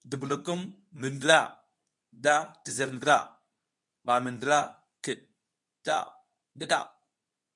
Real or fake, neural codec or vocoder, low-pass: fake; codec, 24 kHz, 0.9 kbps, WavTokenizer, medium speech release version 2; 10.8 kHz